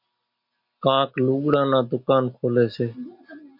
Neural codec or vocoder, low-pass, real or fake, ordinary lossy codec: none; 5.4 kHz; real; MP3, 48 kbps